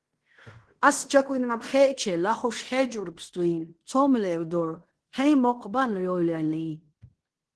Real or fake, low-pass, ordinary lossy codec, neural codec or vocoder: fake; 10.8 kHz; Opus, 16 kbps; codec, 16 kHz in and 24 kHz out, 0.9 kbps, LongCat-Audio-Codec, fine tuned four codebook decoder